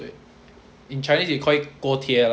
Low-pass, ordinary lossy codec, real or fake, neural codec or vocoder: none; none; real; none